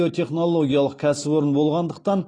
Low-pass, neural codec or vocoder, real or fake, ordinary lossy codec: 9.9 kHz; none; real; AAC, 32 kbps